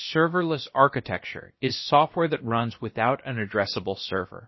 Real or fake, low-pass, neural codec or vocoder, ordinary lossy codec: fake; 7.2 kHz; codec, 16 kHz, about 1 kbps, DyCAST, with the encoder's durations; MP3, 24 kbps